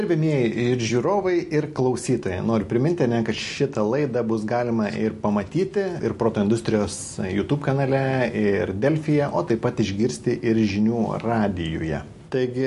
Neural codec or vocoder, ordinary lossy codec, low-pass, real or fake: none; MP3, 48 kbps; 14.4 kHz; real